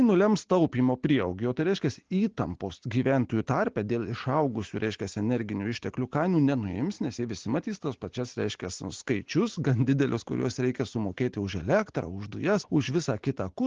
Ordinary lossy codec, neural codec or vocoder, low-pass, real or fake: Opus, 16 kbps; none; 7.2 kHz; real